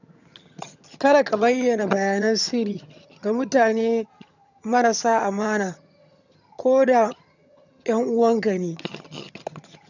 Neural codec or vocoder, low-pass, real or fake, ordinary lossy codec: vocoder, 22.05 kHz, 80 mel bands, HiFi-GAN; 7.2 kHz; fake; none